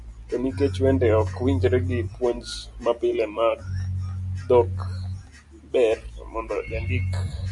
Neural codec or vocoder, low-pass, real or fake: none; 10.8 kHz; real